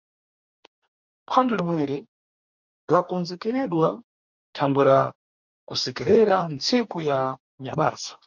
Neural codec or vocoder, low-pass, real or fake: codec, 44.1 kHz, 2.6 kbps, DAC; 7.2 kHz; fake